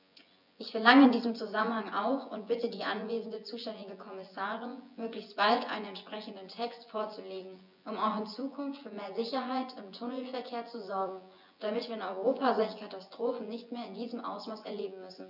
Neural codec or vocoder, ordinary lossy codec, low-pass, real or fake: vocoder, 24 kHz, 100 mel bands, Vocos; none; 5.4 kHz; fake